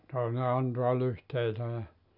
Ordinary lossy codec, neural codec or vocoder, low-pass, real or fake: none; none; 5.4 kHz; real